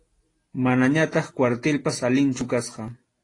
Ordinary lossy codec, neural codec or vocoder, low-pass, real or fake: AAC, 32 kbps; none; 10.8 kHz; real